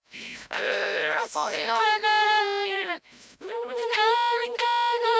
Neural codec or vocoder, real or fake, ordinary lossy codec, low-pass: codec, 16 kHz, 0.5 kbps, FreqCodec, larger model; fake; none; none